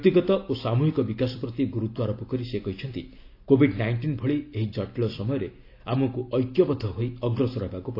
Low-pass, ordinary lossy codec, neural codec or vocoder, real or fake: 5.4 kHz; AAC, 32 kbps; none; real